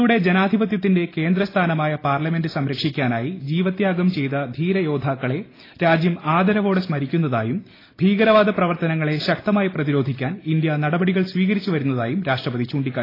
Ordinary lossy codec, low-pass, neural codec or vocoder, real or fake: AAC, 24 kbps; 5.4 kHz; none; real